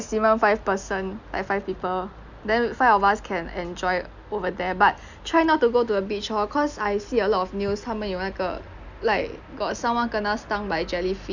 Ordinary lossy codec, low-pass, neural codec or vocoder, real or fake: none; 7.2 kHz; none; real